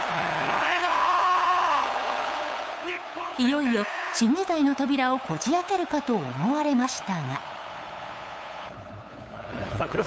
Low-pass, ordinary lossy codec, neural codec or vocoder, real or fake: none; none; codec, 16 kHz, 8 kbps, FunCodec, trained on LibriTTS, 25 frames a second; fake